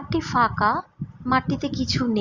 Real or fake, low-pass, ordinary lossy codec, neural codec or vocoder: real; 7.2 kHz; Opus, 64 kbps; none